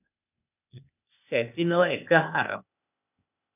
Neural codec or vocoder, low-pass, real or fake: codec, 16 kHz, 0.8 kbps, ZipCodec; 3.6 kHz; fake